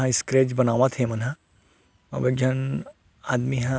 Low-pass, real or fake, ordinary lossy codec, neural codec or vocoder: none; real; none; none